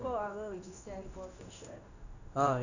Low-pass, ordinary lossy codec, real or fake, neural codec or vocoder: 7.2 kHz; none; fake; codec, 16 kHz in and 24 kHz out, 1 kbps, XY-Tokenizer